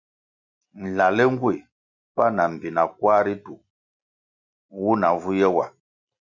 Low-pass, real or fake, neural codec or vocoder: 7.2 kHz; real; none